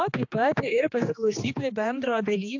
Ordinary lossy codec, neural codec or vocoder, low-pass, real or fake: AAC, 48 kbps; codec, 16 kHz, 2 kbps, X-Codec, HuBERT features, trained on general audio; 7.2 kHz; fake